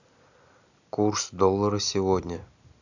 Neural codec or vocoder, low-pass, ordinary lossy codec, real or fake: none; 7.2 kHz; none; real